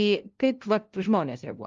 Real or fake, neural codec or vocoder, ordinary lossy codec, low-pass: fake; codec, 16 kHz, 0.5 kbps, FunCodec, trained on LibriTTS, 25 frames a second; Opus, 32 kbps; 7.2 kHz